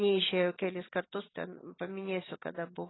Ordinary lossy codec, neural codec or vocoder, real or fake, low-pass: AAC, 16 kbps; none; real; 7.2 kHz